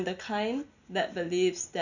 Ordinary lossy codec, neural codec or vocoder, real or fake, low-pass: none; none; real; 7.2 kHz